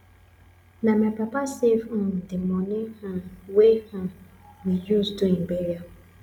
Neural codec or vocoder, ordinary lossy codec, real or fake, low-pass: none; none; real; 19.8 kHz